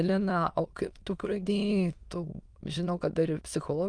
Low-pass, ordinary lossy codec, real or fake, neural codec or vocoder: 9.9 kHz; Opus, 32 kbps; fake; autoencoder, 22.05 kHz, a latent of 192 numbers a frame, VITS, trained on many speakers